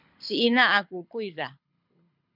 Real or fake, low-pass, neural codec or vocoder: fake; 5.4 kHz; codec, 24 kHz, 6 kbps, HILCodec